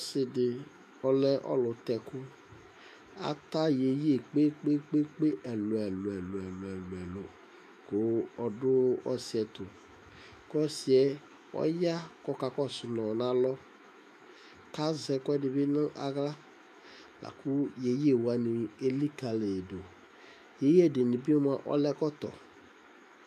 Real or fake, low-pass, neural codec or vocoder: fake; 14.4 kHz; autoencoder, 48 kHz, 128 numbers a frame, DAC-VAE, trained on Japanese speech